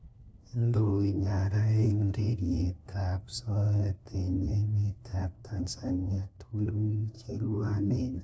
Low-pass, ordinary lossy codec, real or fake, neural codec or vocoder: none; none; fake; codec, 16 kHz, 1 kbps, FunCodec, trained on LibriTTS, 50 frames a second